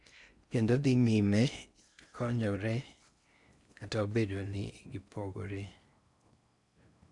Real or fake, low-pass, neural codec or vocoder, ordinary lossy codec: fake; 10.8 kHz; codec, 16 kHz in and 24 kHz out, 0.6 kbps, FocalCodec, streaming, 4096 codes; none